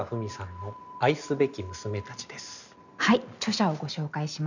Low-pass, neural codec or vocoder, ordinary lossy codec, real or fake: 7.2 kHz; none; none; real